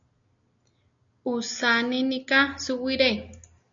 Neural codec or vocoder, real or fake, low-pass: none; real; 7.2 kHz